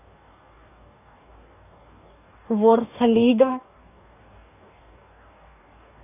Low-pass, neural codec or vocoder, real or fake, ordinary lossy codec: 3.6 kHz; codec, 44.1 kHz, 2.6 kbps, DAC; fake; none